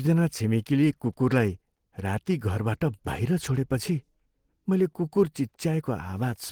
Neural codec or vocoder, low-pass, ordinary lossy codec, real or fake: none; 19.8 kHz; Opus, 16 kbps; real